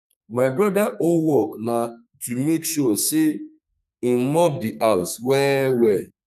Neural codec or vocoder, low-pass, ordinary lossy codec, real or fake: codec, 32 kHz, 1.9 kbps, SNAC; 14.4 kHz; none; fake